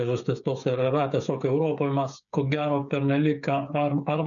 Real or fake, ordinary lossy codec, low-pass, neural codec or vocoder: fake; MP3, 96 kbps; 7.2 kHz; codec, 16 kHz, 8 kbps, FreqCodec, smaller model